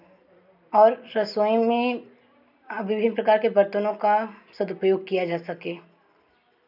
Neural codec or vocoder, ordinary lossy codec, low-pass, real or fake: none; none; 5.4 kHz; real